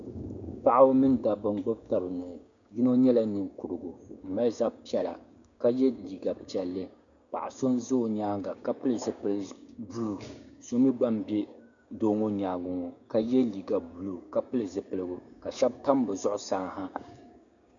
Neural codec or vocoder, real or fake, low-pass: codec, 16 kHz, 6 kbps, DAC; fake; 7.2 kHz